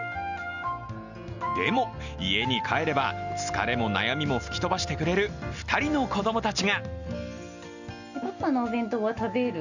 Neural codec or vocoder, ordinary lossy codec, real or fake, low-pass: none; none; real; 7.2 kHz